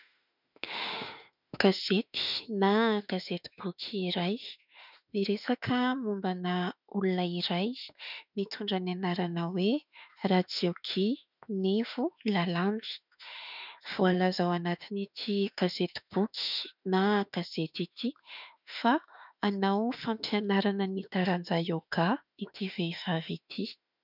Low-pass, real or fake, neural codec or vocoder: 5.4 kHz; fake; autoencoder, 48 kHz, 32 numbers a frame, DAC-VAE, trained on Japanese speech